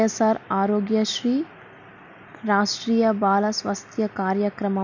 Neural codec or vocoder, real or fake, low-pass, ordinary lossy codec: none; real; 7.2 kHz; none